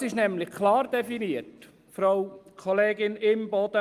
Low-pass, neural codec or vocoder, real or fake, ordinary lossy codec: 14.4 kHz; none; real; Opus, 32 kbps